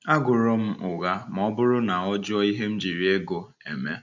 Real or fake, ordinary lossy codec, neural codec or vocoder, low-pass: real; none; none; 7.2 kHz